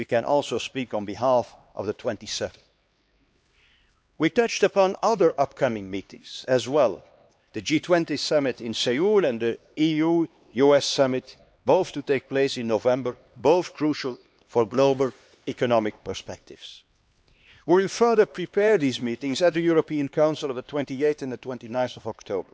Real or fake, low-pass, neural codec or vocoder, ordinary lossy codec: fake; none; codec, 16 kHz, 2 kbps, X-Codec, HuBERT features, trained on LibriSpeech; none